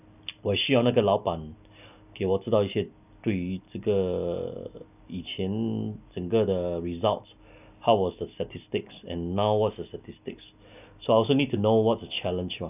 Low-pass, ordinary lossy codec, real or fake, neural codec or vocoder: 3.6 kHz; none; real; none